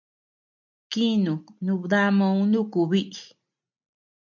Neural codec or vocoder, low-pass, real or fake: none; 7.2 kHz; real